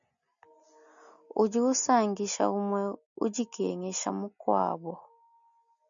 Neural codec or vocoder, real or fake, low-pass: none; real; 7.2 kHz